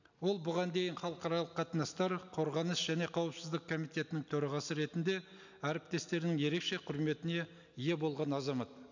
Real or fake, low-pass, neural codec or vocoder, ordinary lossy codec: real; 7.2 kHz; none; none